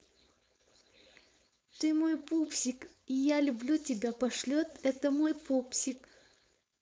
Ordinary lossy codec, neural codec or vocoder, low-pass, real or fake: none; codec, 16 kHz, 4.8 kbps, FACodec; none; fake